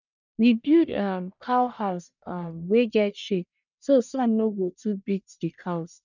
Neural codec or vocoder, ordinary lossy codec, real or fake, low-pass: codec, 44.1 kHz, 1.7 kbps, Pupu-Codec; none; fake; 7.2 kHz